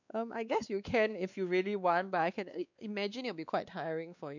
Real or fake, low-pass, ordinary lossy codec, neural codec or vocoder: fake; 7.2 kHz; none; codec, 16 kHz, 2 kbps, X-Codec, WavLM features, trained on Multilingual LibriSpeech